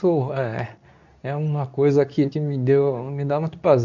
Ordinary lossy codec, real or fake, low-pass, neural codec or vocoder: none; fake; 7.2 kHz; codec, 24 kHz, 0.9 kbps, WavTokenizer, medium speech release version 2